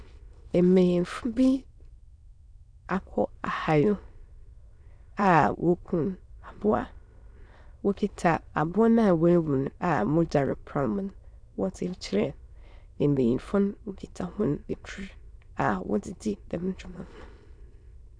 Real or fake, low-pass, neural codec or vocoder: fake; 9.9 kHz; autoencoder, 22.05 kHz, a latent of 192 numbers a frame, VITS, trained on many speakers